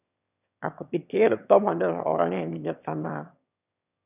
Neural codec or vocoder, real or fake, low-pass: autoencoder, 22.05 kHz, a latent of 192 numbers a frame, VITS, trained on one speaker; fake; 3.6 kHz